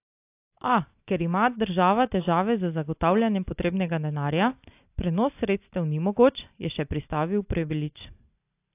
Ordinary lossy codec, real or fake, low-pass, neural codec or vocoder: AAC, 32 kbps; real; 3.6 kHz; none